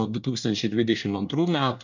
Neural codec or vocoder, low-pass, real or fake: codec, 24 kHz, 1 kbps, SNAC; 7.2 kHz; fake